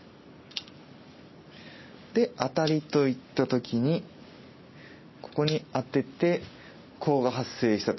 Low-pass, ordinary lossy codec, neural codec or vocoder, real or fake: 7.2 kHz; MP3, 24 kbps; none; real